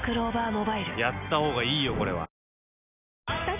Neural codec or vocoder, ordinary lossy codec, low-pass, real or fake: none; none; 3.6 kHz; real